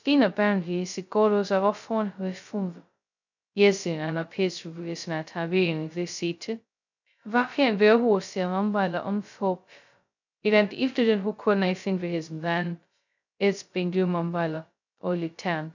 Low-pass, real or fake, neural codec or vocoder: 7.2 kHz; fake; codec, 16 kHz, 0.2 kbps, FocalCodec